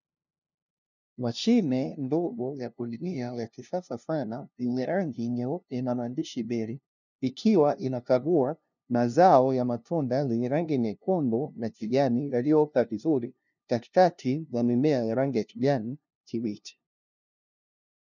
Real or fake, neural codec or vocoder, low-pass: fake; codec, 16 kHz, 0.5 kbps, FunCodec, trained on LibriTTS, 25 frames a second; 7.2 kHz